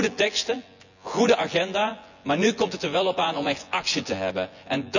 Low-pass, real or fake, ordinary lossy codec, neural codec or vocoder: 7.2 kHz; fake; none; vocoder, 24 kHz, 100 mel bands, Vocos